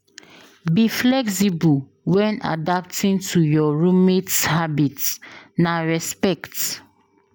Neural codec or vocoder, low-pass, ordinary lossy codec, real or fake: none; none; none; real